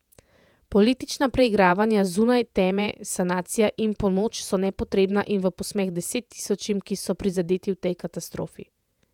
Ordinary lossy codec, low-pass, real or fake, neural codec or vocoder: none; 19.8 kHz; fake; vocoder, 48 kHz, 128 mel bands, Vocos